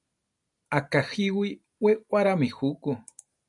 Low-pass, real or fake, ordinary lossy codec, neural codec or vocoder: 10.8 kHz; real; MP3, 96 kbps; none